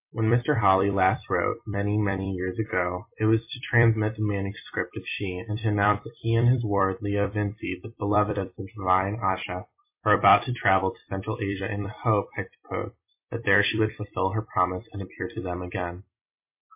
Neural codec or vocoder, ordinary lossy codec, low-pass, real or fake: vocoder, 44.1 kHz, 128 mel bands every 256 samples, BigVGAN v2; MP3, 24 kbps; 3.6 kHz; fake